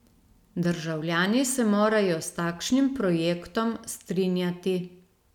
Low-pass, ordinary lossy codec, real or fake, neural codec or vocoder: 19.8 kHz; none; real; none